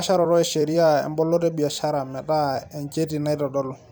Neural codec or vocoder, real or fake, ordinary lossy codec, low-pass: vocoder, 44.1 kHz, 128 mel bands every 256 samples, BigVGAN v2; fake; none; none